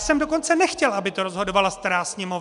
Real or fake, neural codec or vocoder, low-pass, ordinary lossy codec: real; none; 10.8 kHz; MP3, 96 kbps